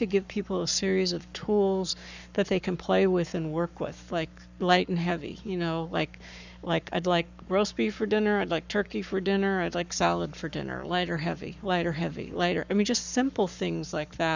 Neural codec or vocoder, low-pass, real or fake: codec, 44.1 kHz, 7.8 kbps, Pupu-Codec; 7.2 kHz; fake